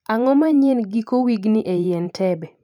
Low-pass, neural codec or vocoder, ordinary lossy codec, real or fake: 19.8 kHz; vocoder, 44.1 kHz, 128 mel bands every 512 samples, BigVGAN v2; none; fake